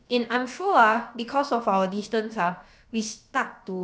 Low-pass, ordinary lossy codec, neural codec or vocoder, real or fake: none; none; codec, 16 kHz, about 1 kbps, DyCAST, with the encoder's durations; fake